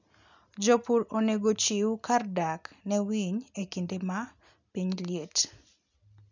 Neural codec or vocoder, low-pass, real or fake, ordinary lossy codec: none; 7.2 kHz; real; none